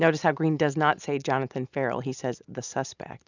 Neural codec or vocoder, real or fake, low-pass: none; real; 7.2 kHz